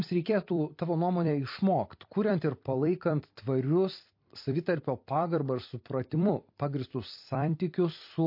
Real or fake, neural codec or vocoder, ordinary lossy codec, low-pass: fake; vocoder, 44.1 kHz, 128 mel bands every 256 samples, BigVGAN v2; MP3, 32 kbps; 5.4 kHz